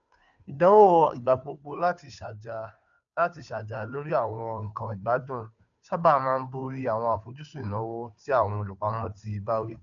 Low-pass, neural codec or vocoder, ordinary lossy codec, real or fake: 7.2 kHz; codec, 16 kHz, 2 kbps, FunCodec, trained on Chinese and English, 25 frames a second; none; fake